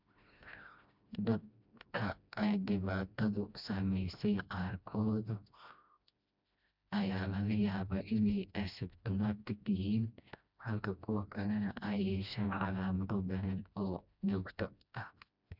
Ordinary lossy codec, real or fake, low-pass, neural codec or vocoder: none; fake; 5.4 kHz; codec, 16 kHz, 1 kbps, FreqCodec, smaller model